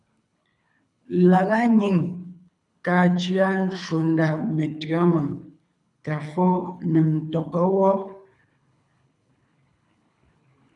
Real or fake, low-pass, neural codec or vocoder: fake; 10.8 kHz; codec, 24 kHz, 3 kbps, HILCodec